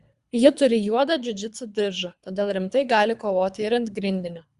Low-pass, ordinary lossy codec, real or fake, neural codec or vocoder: 10.8 kHz; Opus, 64 kbps; fake; codec, 24 kHz, 3 kbps, HILCodec